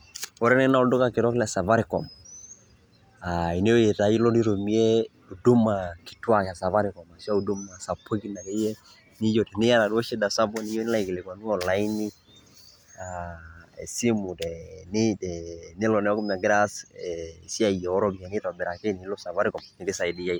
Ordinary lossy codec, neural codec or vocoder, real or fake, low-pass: none; none; real; none